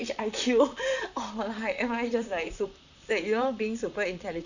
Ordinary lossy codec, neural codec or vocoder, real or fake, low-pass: none; vocoder, 44.1 kHz, 128 mel bands, Pupu-Vocoder; fake; 7.2 kHz